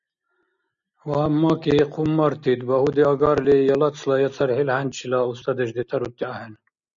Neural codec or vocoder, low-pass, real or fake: none; 7.2 kHz; real